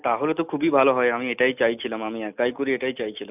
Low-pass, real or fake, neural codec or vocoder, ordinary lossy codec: 3.6 kHz; real; none; none